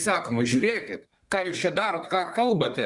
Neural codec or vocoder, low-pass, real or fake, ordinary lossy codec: codec, 24 kHz, 1 kbps, SNAC; 10.8 kHz; fake; Opus, 64 kbps